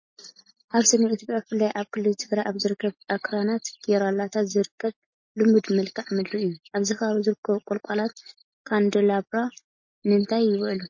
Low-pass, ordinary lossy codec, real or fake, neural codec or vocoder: 7.2 kHz; MP3, 32 kbps; real; none